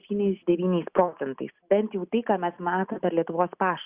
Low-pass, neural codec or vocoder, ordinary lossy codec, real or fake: 3.6 kHz; none; Opus, 64 kbps; real